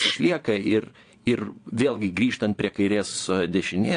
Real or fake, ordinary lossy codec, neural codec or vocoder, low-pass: fake; AAC, 48 kbps; vocoder, 22.05 kHz, 80 mel bands, Vocos; 9.9 kHz